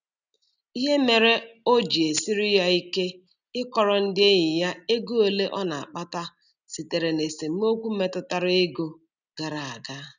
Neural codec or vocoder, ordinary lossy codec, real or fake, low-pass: none; none; real; 7.2 kHz